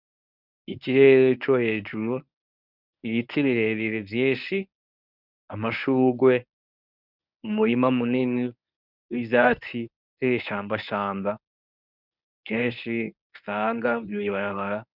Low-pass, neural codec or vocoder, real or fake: 5.4 kHz; codec, 24 kHz, 0.9 kbps, WavTokenizer, medium speech release version 1; fake